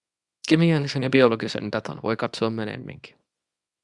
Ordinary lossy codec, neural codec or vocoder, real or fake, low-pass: Opus, 64 kbps; codec, 24 kHz, 0.9 kbps, WavTokenizer, small release; fake; 10.8 kHz